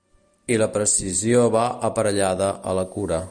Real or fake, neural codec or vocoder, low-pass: real; none; 9.9 kHz